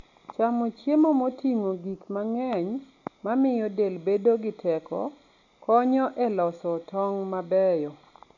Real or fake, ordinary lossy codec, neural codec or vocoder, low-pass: real; none; none; 7.2 kHz